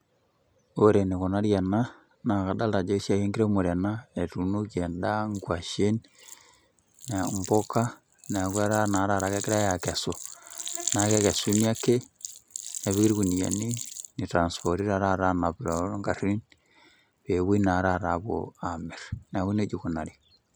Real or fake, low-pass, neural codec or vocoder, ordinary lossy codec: real; none; none; none